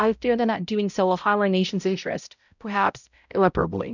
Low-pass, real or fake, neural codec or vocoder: 7.2 kHz; fake; codec, 16 kHz, 0.5 kbps, X-Codec, HuBERT features, trained on balanced general audio